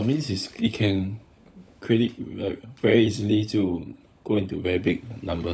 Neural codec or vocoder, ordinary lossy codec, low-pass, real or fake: codec, 16 kHz, 16 kbps, FunCodec, trained on LibriTTS, 50 frames a second; none; none; fake